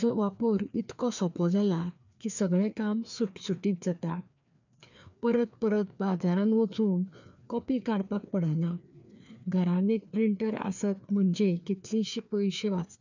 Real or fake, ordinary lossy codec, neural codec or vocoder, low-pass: fake; none; codec, 16 kHz, 2 kbps, FreqCodec, larger model; 7.2 kHz